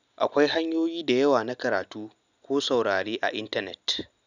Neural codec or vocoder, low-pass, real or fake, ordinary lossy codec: none; 7.2 kHz; real; none